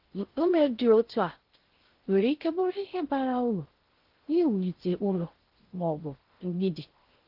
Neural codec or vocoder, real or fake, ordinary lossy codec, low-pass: codec, 16 kHz in and 24 kHz out, 0.6 kbps, FocalCodec, streaming, 4096 codes; fake; Opus, 16 kbps; 5.4 kHz